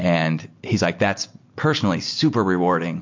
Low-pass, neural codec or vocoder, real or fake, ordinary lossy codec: 7.2 kHz; none; real; MP3, 48 kbps